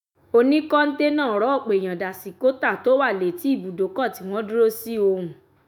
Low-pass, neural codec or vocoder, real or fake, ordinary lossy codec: none; autoencoder, 48 kHz, 128 numbers a frame, DAC-VAE, trained on Japanese speech; fake; none